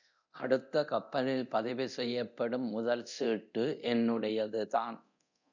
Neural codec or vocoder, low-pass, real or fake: codec, 24 kHz, 0.9 kbps, DualCodec; 7.2 kHz; fake